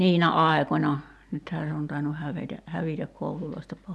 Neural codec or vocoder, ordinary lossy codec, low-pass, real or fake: none; none; none; real